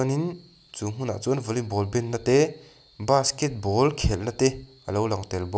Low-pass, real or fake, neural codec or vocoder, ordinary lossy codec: none; real; none; none